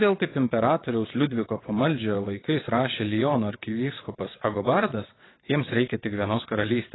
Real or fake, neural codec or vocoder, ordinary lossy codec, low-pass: fake; vocoder, 22.05 kHz, 80 mel bands, WaveNeXt; AAC, 16 kbps; 7.2 kHz